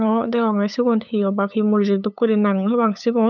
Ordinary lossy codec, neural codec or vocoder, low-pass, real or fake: none; codec, 16 kHz, 8 kbps, FunCodec, trained on LibriTTS, 25 frames a second; 7.2 kHz; fake